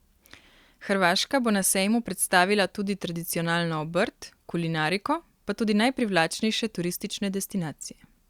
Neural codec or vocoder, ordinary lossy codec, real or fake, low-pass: none; Opus, 64 kbps; real; 19.8 kHz